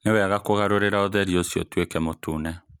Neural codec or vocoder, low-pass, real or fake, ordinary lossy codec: none; 19.8 kHz; real; none